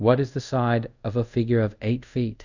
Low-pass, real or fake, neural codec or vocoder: 7.2 kHz; fake; codec, 24 kHz, 0.5 kbps, DualCodec